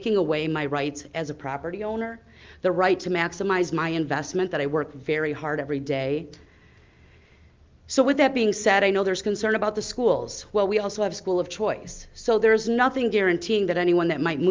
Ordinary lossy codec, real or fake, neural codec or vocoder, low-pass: Opus, 24 kbps; real; none; 7.2 kHz